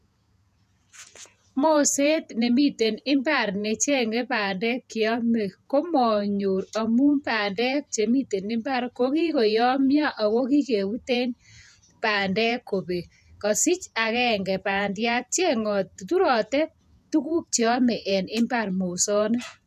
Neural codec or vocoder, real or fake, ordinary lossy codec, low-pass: vocoder, 48 kHz, 128 mel bands, Vocos; fake; none; 14.4 kHz